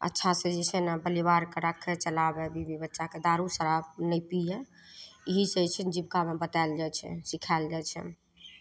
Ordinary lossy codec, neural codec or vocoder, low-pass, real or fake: none; none; none; real